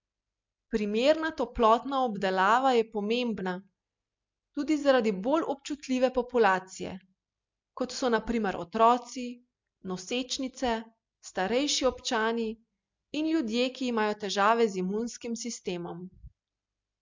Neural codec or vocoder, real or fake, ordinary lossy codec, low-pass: none; real; MP3, 64 kbps; 7.2 kHz